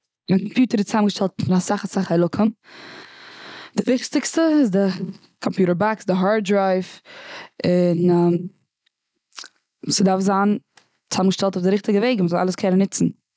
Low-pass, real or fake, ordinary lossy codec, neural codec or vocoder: none; real; none; none